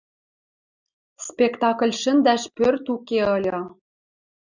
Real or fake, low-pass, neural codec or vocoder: real; 7.2 kHz; none